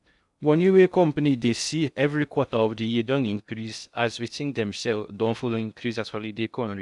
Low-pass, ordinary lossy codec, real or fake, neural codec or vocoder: 10.8 kHz; none; fake; codec, 16 kHz in and 24 kHz out, 0.6 kbps, FocalCodec, streaming, 2048 codes